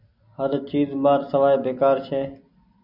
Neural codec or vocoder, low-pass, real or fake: none; 5.4 kHz; real